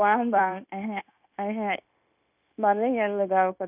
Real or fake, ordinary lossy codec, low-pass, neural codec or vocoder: fake; none; 3.6 kHz; codec, 16 kHz, 0.9 kbps, LongCat-Audio-Codec